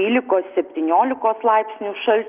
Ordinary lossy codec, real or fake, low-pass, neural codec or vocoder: Opus, 32 kbps; real; 3.6 kHz; none